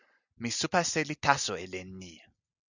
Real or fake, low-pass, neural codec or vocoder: real; 7.2 kHz; none